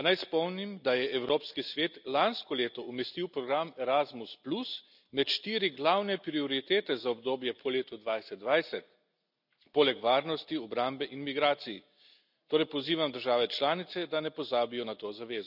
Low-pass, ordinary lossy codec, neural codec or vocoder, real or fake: 5.4 kHz; none; none; real